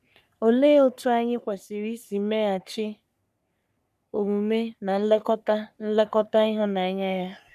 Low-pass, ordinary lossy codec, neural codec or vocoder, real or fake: 14.4 kHz; none; codec, 44.1 kHz, 3.4 kbps, Pupu-Codec; fake